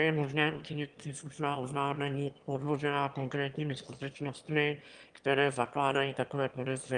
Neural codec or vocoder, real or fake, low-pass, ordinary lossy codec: autoencoder, 22.05 kHz, a latent of 192 numbers a frame, VITS, trained on one speaker; fake; 9.9 kHz; Opus, 32 kbps